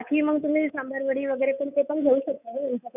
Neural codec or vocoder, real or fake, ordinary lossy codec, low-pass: codec, 24 kHz, 3.1 kbps, DualCodec; fake; none; 3.6 kHz